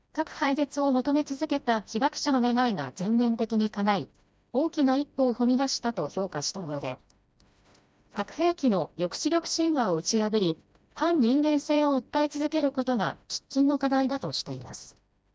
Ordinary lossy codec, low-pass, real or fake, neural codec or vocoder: none; none; fake; codec, 16 kHz, 1 kbps, FreqCodec, smaller model